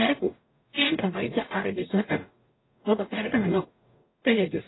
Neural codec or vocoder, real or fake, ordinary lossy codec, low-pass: codec, 44.1 kHz, 0.9 kbps, DAC; fake; AAC, 16 kbps; 7.2 kHz